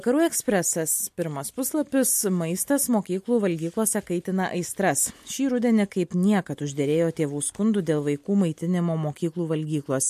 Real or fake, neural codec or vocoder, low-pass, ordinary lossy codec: real; none; 14.4 kHz; MP3, 64 kbps